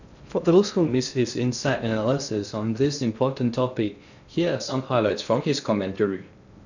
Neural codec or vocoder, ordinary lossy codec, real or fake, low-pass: codec, 16 kHz in and 24 kHz out, 0.6 kbps, FocalCodec, streaming, 2048 codes; none; fake; 7.2 kHz